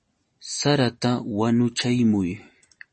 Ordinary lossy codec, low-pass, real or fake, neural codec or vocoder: MP3, 32 kbps; 10.8 kHz; real; none